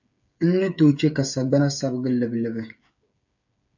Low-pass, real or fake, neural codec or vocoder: 7.2 kHz; fake; codec, 16 kHz, 8 kbps, FreqCodec, smaller model